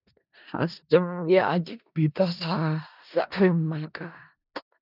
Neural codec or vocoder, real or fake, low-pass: codec, 16 kHz in and 24 kHz out, 0.4 kbps, LongCat-Audio-Codec, four codebook decoder; fake; 5.4 kHz